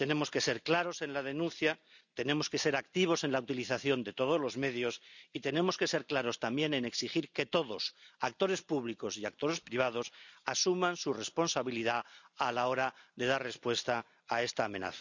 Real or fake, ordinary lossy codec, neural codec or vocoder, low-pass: real; none; none; 7.2 kHz